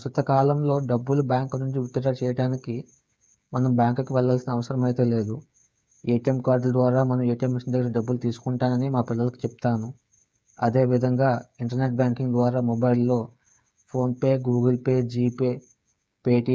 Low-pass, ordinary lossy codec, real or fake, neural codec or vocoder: none; none; fake; codec, 16 kHz, 8 kbps, FreqCodec, smaller model